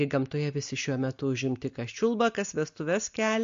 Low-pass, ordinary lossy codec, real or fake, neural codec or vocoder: 7.2 kHz; MP3, 48 kbps; real; none